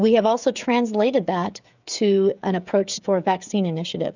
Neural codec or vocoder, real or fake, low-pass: codec, 16 kHz, 16 kbps, FreqCodec, smaller model; fake; 7.2 kHz